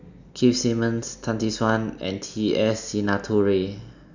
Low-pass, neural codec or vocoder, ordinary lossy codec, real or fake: 7.2 kHz; none; none; real